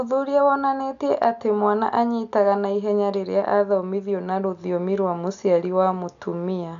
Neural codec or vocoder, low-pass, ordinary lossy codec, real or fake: none; 7.2 kHz; none; real